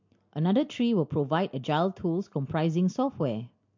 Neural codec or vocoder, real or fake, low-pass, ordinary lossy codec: none; real; 7.2 kHz; MP3, 48 kbps